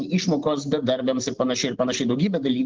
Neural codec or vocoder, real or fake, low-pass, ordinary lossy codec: none; real; 7.2 kHz; Opus, 16 kbps